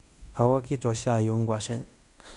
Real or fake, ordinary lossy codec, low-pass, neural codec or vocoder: fake; none; 10.8 kHz; codec, 16 kHz in and 24 kHz out, 0.9 kbps, LongCat-Audio-Codec, fine tuned four codebook decoder